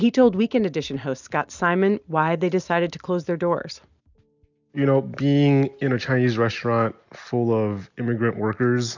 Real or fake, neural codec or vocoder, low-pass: real; none; 7.2 kHz